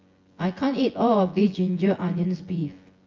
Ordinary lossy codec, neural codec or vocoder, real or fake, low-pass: Opus, 32 kbps; vocoder, 24 kHz, 100 mel bands, Vocos; fake; 7.2 kHz